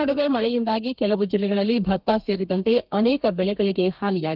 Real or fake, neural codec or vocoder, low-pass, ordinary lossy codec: fake; codec, 32 kHz, 1.9 kbps, SNAC; 5.4 kHz; Opus, 32 kbps